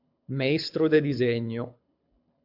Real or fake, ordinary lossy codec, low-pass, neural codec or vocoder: fake; MP3, 48 kbps; 5.4 kHz; codec, 24 kHz, 6 kbps, HILCodec